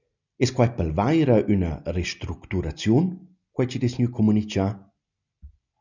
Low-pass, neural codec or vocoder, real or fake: 7.2 kHz; none; real